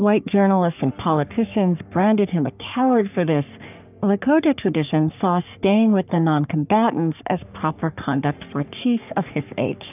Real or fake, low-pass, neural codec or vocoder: fake; 3.6 kHz; codec, 44.1 kHz, 3.4 kbps, Pupu-Codec